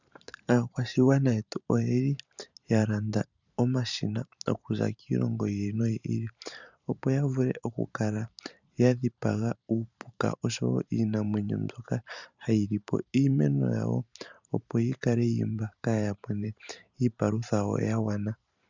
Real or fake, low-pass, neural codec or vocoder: fake; 7.2 kHz; vocoder, 44.1 kHz, 128 mel bands every 512 samples, BigVGAN v2